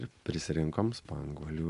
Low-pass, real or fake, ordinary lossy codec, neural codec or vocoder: 10.8 kHz; real; AAC, 64 kbps; none